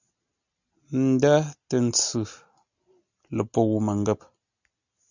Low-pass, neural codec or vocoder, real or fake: 7.2 kHz; none; real